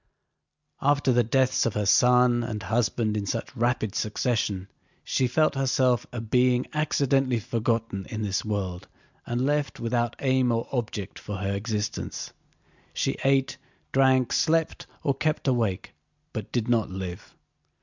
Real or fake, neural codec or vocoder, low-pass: real; none; 7.2 kHz